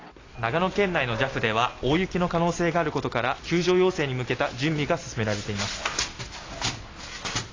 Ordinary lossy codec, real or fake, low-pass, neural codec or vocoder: AAC, 32 kbps; real; 7.2 kHz; none